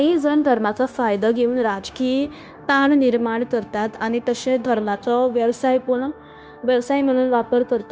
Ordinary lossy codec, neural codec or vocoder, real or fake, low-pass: none; codec, 16 kHz, 0.9 kbps, LongCat-Audio-Codec; fake; none